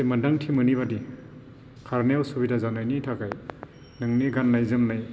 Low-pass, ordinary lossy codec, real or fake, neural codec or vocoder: none; none; real; none